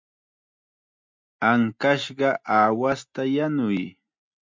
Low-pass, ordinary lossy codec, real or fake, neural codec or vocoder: 7.2 kHz; MP3, 64 kbps; real; none